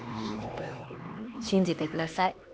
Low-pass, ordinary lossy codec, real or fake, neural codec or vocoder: none; none; fake; codec, 16 kHz, 2 kbps, X-Codec, HuBERT features, trained on LibriSpeech